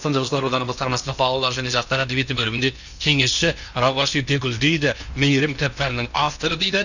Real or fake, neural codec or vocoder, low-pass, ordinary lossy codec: fake; codec, 16 kHz in and 24 kHz out, 0.8 kbps, FocalCodec, streaming, 65536 codes; 7.2 kHz; none